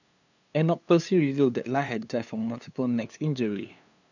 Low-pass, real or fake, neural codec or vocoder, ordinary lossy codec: 7.2 kHz; fake; codec, 16 kHz, 2 kbps, FunCodec, trained on LibriTTS, 25 frames a second; none